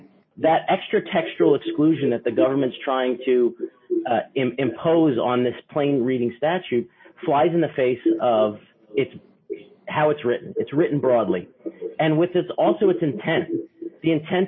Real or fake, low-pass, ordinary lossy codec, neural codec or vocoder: real; 7.2 kHz; MP3, 24 kbps; none